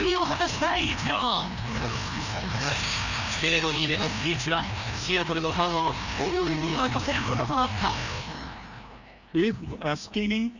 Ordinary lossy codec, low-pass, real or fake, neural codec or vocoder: none; 7.2 kHz; fake; codec, 16 kHz, 1 kbps, FreqCodec, larger model